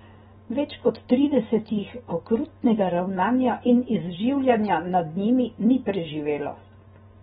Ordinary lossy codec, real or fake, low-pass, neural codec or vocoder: AAC, 16 kbps; real; 19.8 kHz; none